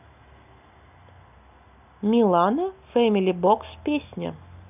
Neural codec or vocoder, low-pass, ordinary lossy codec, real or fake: none; 3.6 kHz; none; real